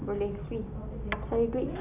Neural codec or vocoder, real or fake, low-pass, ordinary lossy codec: none; real; 3.6 kHz; none